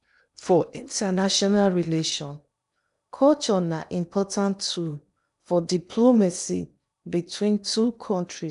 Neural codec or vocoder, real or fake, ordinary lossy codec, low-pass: codec, 16 kHz in and 24 kHz out, 0.8 kbps, FocalCodec, streaming, 65536 codes; fake; none; 10.8 kHz